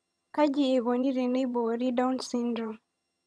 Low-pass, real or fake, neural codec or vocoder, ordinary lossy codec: none; fake; vocoder, 22.05 kHz, 80 mel bands, HiFi-GAN; none